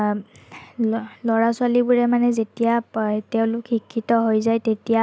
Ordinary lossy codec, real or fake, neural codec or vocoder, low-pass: none; real; none; none